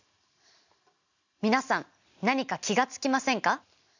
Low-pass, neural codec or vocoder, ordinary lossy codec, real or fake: 7.2 kHz; none; none; real